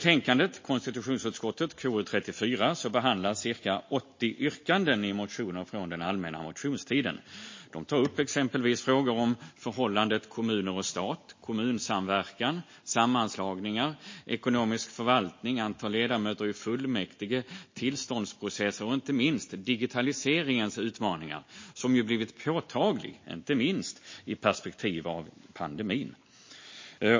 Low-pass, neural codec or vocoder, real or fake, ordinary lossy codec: 7.2 kHz; none; real; MP3, 32 kbps